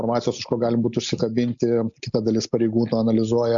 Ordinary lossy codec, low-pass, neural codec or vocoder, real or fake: MP3, 64 kbps; 7.2 kHz; none; real